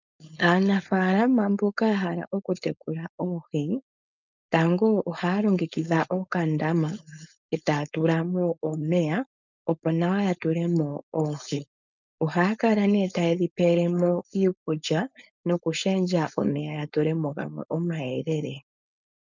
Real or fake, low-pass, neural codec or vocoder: fake; 7.2 kHz; codec, 16 kHz, 4.8 kbps, FACodec